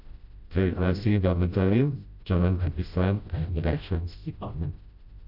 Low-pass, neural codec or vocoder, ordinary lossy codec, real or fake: 5.4 kHz; codec, 16 kHz, 0.5 kbps, FreqCodec, smaller model; none; fake